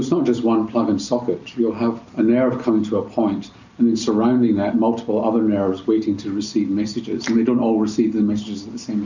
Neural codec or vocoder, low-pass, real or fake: none; 7.2 kHz; real